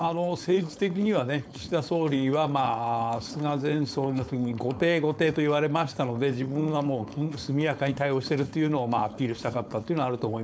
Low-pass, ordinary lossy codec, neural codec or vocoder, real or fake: none; none; codec, 16 kHz, 4.8 kbps, FACodec; fake